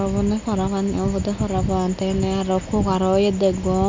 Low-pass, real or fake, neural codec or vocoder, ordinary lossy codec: 7.2 kHz; real; none; MP3, 64 kbps